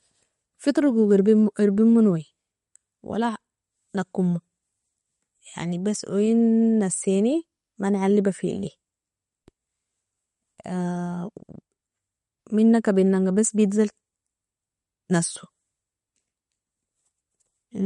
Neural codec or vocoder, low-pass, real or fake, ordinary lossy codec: none; 19.8 kHz; real; MP3, 48 kbps